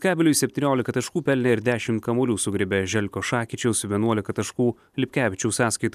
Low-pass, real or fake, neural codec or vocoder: 14.4 kHz; real; none